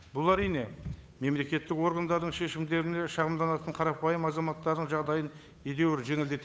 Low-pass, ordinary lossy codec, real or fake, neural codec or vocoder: none; none; fake; codec, 16 kHz, 8 kbps, FunCodec, trained on Chinese and English, 25 frames a second